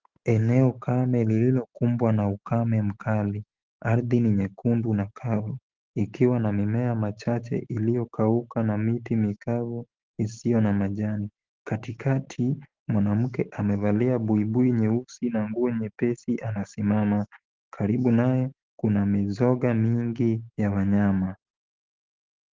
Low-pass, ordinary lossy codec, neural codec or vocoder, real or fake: 7.2 kHz; Opus, 16 kbps; none; real